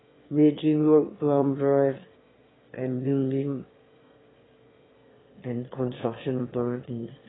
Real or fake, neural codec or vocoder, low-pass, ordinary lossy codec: fake; autoencoder, 22.05 kHz, a latent of 192 numbers a frame, VITS, trained on one speaker; 7.2 kHz; AAC, 16 kbps